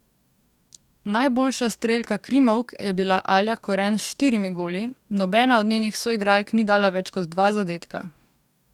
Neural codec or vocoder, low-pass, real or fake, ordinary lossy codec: codec, 44.1 kHz, 2.6 kbps, DAC; 19.8 kHz; fake; none